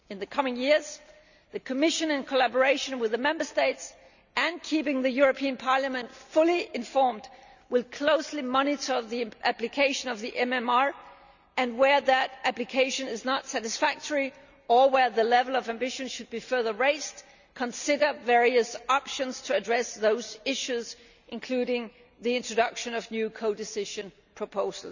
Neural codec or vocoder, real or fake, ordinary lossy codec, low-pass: none; real; none; 7.2 kHz